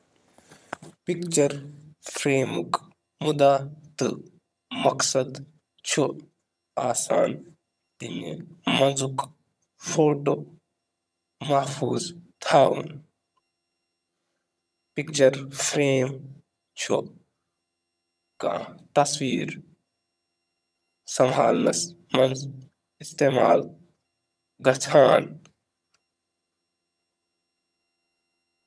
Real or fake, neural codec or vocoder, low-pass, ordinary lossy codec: fake; vocoder, 22.05 kHz, 80 mel bands, HiFi-GAN; none; none